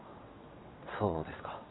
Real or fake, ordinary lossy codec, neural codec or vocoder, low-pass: fake; AAC, 16 kbps; vocoder, 22.05 kHz, 80 mel bands, WaveNeXt; 7.2 kHz